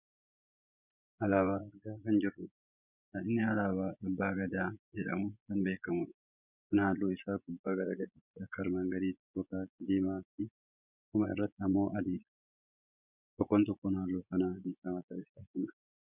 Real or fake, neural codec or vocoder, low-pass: fake; vocoder, 24 kHz, 100 mel bands, Vocos; 3.6 kHz